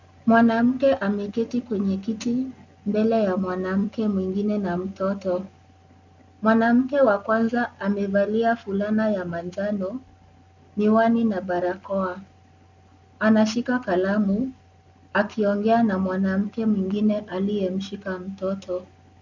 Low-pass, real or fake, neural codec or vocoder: 7.2 kHz; real; none